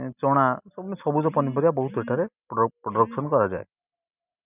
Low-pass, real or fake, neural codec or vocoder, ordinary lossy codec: 3.6 kHz; real; none; none